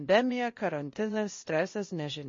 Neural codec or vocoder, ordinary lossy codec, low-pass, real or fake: codec, 16 kHz, 0.8 kbps, ZipCodec; MP3, 32 kbps; 7.2 kHz; fake